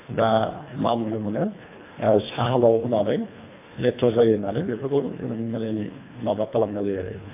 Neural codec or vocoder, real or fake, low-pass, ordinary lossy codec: codec, 24 kHz, 1.5 kbps, HILCodec; fake; 3.6 kHz; AAC, 24 kbps